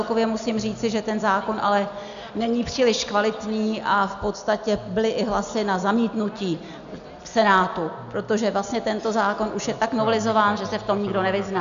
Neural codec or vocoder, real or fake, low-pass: none; real; 7.2 kHz